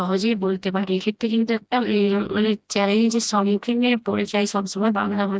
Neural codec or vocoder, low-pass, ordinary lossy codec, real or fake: codec, 16 kHz, 1 kbps, FreqCodec, smaller model; none; none; fake